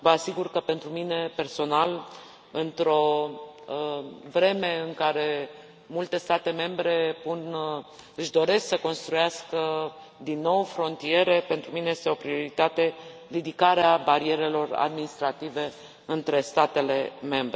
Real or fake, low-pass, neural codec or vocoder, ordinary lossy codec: real; none; none; none